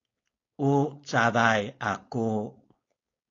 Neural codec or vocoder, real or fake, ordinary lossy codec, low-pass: codec, 16 kHz, 4.8 kbps, FACodec; fake; AAC, 32 kbps; 7.2 kHz